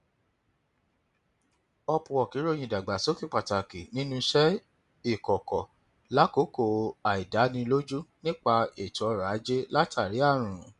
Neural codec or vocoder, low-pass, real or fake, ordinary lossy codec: none; 10.8 kHz; real; none